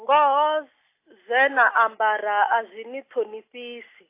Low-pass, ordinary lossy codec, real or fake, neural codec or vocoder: 3.6 kHz; AAC, 24 kbps; real; none